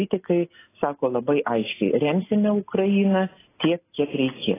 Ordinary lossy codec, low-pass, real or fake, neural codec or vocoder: AAC, 16 kbps; 3.6 kHz; real; none